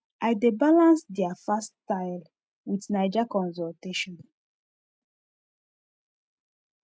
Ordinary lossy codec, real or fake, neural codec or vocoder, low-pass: none; real; none; none